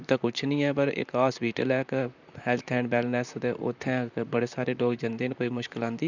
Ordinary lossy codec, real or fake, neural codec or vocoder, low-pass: none; fake; vocoder, 44.1 kHz, 128 mel bands, Pupu-Vocoder; 7.2 kHz